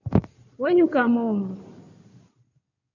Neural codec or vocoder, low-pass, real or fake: codec, 16 kHz in and 24 kHz out, 2.2 kbps, FireRedTTS-2 codec; 7.2 kHz; fake